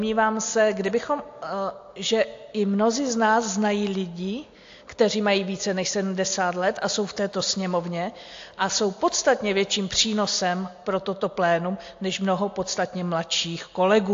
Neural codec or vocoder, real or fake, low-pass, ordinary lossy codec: none; real; 7.2 kHz; AAC, 48 kbps